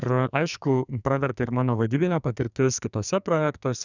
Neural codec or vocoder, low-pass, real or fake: codec, 44.1 kHz, 2.6 kbps, SNAC; 7.2 kHz; fake